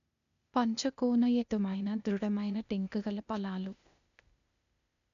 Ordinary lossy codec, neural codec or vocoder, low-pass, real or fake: none; codec, 16 kHz, 0.8 kbps, ZipCodec; 7.2 kHz; fake